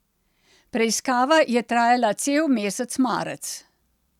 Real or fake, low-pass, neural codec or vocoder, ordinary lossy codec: real; 19.8 kHz; none; none